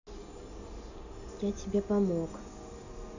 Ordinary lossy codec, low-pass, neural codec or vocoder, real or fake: none; 7.2 kHz; none; real